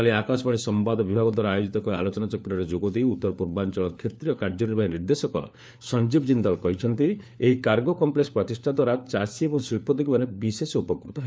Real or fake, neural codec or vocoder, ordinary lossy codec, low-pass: fake; codec, 16 kHz, 4 kbps, FunCodec, trained on LibriTTS, 50 frames a second; none; none